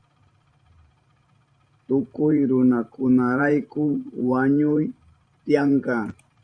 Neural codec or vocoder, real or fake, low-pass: vocoder, 44.1 kHz, 128 mel bands every 512 samples, BigVGAN v2; fake; 9.9 kHz